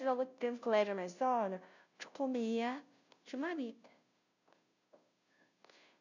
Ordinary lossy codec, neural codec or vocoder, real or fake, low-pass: none; codec, 16 kHz, 0.5 kbps, FunCodec, trained on Chinese and English, 25 frames a second; fake; 7.2 kHz